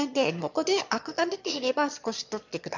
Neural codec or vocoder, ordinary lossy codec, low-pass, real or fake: autoencoder, 22.05 kHz, a latent of 192 numbers a frame, VITS, trained on one speaker; none; 7.2 kHz; fake